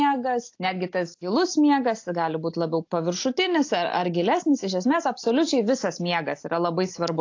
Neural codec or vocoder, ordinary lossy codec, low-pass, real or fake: none; AAC, 48 kbps; 7.2 kHz; real